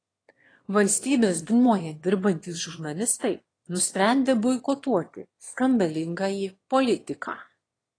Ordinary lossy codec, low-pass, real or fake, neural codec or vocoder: AAC, 32 kbps; 9.9 kHz; fake; autoencoder, 22.05 kHz, a latent of 192 numbers a frame, VITS, trained on one speaker